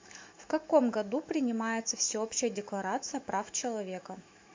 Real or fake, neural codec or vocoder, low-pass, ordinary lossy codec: fake; autoencoder, 48 kHz, 128 numbers a frame, DAC-VAE, trained on Japanese speech; 7.2 kHz; MP3, 48 kbps